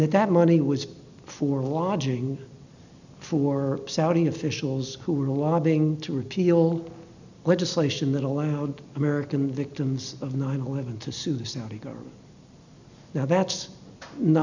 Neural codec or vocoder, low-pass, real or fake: none; 7.2 kHz; real